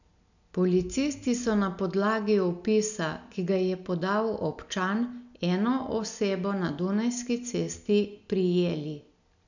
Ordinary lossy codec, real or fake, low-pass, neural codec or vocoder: none; real; 7.2 kHz; none